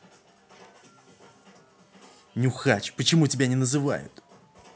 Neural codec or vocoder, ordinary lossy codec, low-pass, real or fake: none; none; none; real